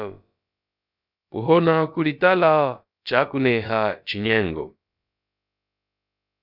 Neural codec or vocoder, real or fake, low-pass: codec, 16 kHz, about 1 kbps, DyCAST, with the encoder's durations; fake; 5.4 kHz